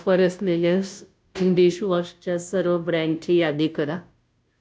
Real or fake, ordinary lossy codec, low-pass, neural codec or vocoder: fake; none; none; codec, 16 kHz, 0.5 kbps, FunCodec, trained on Chinese and English, 25 frames a second